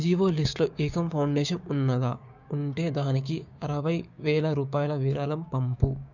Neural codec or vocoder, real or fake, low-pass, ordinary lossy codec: codec, 16 kHz, 6 kbps, DAC; fake; 7.2 kHz; none